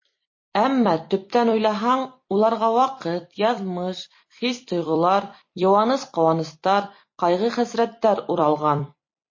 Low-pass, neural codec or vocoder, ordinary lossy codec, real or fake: 7.2 kHz; vocoder, 44.1 kHz, 128 mel bands every 256 samples, BigVGAN v2; MP3, 32 kbps; fake